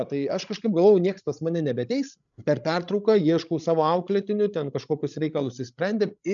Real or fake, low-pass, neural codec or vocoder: fake; 7.2 kHz; codec, 16 kHz, 16 kbps, FreqCodec, larger model